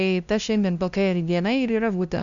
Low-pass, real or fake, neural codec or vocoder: 7.2 kHz; fake; codec, 16 kHz, 0.5 kbps, FunCodec, trained on LibriTTS, 25 frames a second